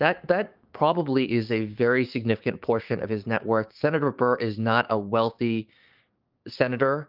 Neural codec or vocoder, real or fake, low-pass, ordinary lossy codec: codec, 16 kHz, 6 kbps, DAC; fake; 5.4 kHz; Opus, 24 kbps